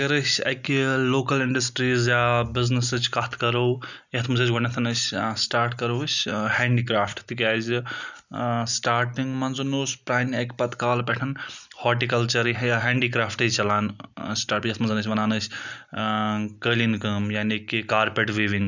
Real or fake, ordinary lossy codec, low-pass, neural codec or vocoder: real; none; 7.2 kHz; none